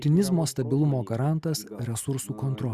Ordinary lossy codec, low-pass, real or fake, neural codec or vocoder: Opus, 64 kbps; 14.4 kHz; real; none